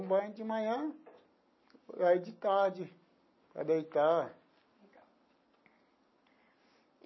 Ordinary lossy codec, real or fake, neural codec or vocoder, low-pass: MP3, 24 kbps; real; none; 7.2 kHz